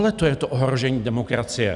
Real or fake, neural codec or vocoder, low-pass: real; none; 10.8 kHz